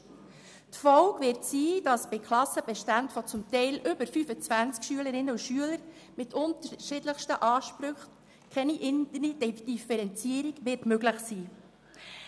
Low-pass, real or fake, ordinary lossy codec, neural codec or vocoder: none; real; none; none